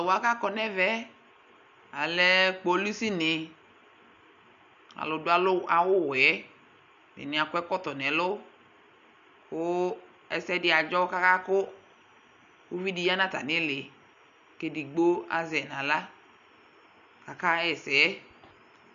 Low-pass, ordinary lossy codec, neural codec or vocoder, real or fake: 7.2 kHz; MP3, 96 kbps; none; real